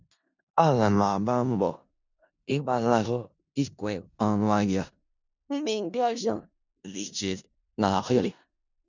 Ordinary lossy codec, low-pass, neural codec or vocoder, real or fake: none; 7.2 kHz; codec, 16 kHz in and 24 kHz out, 0.4 kbps, LongCat-Audio-Codec, four codebook decoder; fake